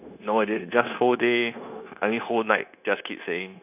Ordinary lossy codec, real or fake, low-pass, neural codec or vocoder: none; fake; 3.6 kHz; codec, 16 kHz, 0.9 kbps, LongCat-Audio-Codec